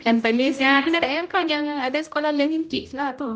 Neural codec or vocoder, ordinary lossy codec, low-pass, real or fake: codec, 16 kHz, 0.5 kbps, X-Codec, HuBERT features, trained on general audio; none; none; fake